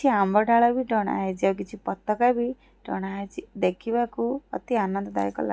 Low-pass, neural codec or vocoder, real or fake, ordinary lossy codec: none; none; real; none